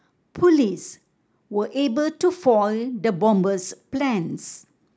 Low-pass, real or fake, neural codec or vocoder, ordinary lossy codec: none; real; none; none